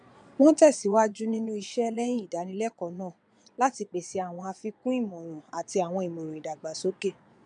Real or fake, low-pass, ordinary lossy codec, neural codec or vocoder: fake; 9.9 kHz; none; vocoder, 22.05 kHz, 80 mel bands, Vocos